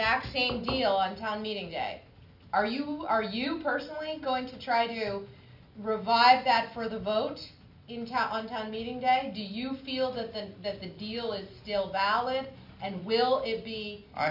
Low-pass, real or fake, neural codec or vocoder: 5.4 kHz; real; none